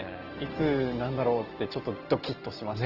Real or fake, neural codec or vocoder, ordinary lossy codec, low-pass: real; none; Opus, 16 kbps; 5.4 kHz